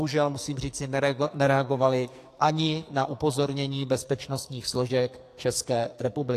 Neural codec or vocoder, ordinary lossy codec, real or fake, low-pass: codec, 44.1 kHz, 2.6 kbps, SNAC; AAC, 64 kbps; fake; 14.4 kHz